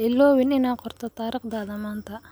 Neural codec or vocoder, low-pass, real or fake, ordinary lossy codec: none; none; real; none